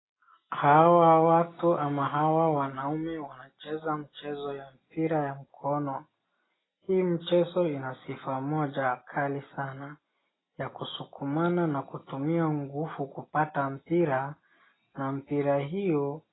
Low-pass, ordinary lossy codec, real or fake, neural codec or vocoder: 7.2 kHz; AAC, 16 kbps; real; none